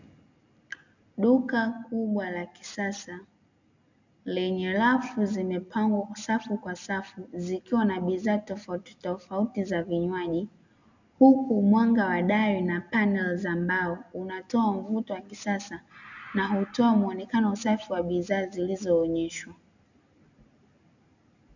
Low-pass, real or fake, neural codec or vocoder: 7.2 kHz; real; none